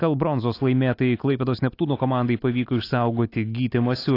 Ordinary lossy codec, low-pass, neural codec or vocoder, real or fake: AAC, 32 kbps; 5.4 kHz; none; real